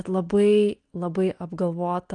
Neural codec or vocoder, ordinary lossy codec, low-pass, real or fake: none; Opus, 24 kbps; 9.9 kHz; real